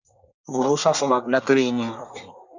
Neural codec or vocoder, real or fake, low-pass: codec, 24 kHz, 1 kbps, SNAC; fake; 7.2 kHz